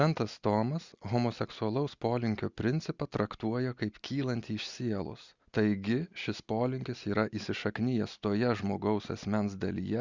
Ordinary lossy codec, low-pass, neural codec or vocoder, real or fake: Opus, 64 kbps; 7.2 kHz; none; real